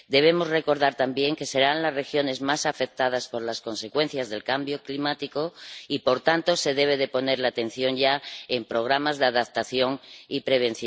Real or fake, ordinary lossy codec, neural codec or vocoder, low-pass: real; none; none; none